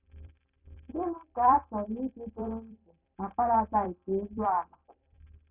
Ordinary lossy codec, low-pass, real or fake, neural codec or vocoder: none; 3.6 kHz; fake; vocoder, 44.1 kHz, 128 mel bands every 256 samples, BigVGAN v2